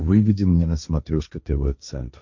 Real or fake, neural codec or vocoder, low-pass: fake; codec, 16 kHz, 1.1 kbps, Voila-Tokenizer; 7.2 kHz